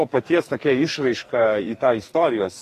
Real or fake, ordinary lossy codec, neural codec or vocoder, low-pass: fake; AAC, 48 kbps; codec, 44.1 kHz, 2.6 kbps, SNAC; 14.4 kHz